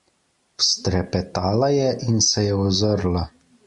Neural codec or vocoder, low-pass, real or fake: none; 10.8 kHz; real